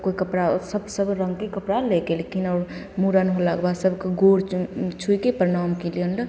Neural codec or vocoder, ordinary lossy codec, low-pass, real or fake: none; none; none; real